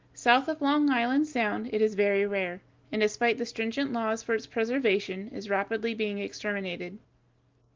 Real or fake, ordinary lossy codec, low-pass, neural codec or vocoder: real; Opus, 32 kbps; 7.2 kHz; none